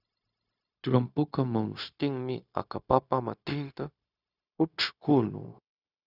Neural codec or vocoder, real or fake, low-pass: codec, 16 kHz, 0.4 kbps, LongCat-Audio-Codec; fake; 5.4 kHz